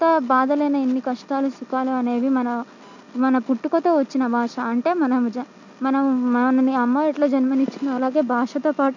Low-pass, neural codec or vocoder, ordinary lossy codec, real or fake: 7.2 kHz; none; none; real